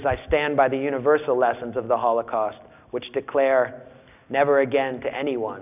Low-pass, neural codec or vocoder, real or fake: 3.6 kHz; none; real